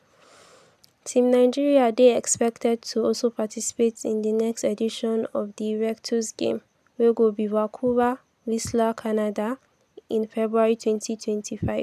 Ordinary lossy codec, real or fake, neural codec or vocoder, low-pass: none; real; none; 14.4 kHz